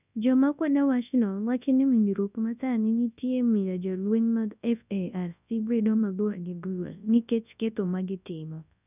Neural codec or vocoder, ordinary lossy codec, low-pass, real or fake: codec, 24 kHz, 0.9 kbps, WavTokenizer, large speech release; none; 3.6 kHz; fake